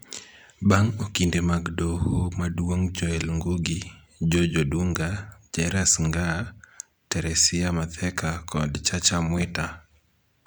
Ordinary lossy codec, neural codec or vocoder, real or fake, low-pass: none; none; real; none